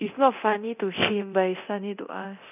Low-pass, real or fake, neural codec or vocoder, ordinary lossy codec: 3.6 kHz; fake; codec, 24 kHz, 0.9 kbps, DualCodec; none